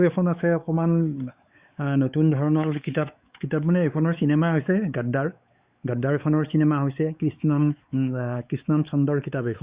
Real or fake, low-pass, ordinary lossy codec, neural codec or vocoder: fake; 3.6 kHz; Opus, 64 kbps; codec, 16 kHz, 4 kbps, X-Codec, WavLM features, trained on Multilingual LibriSpeech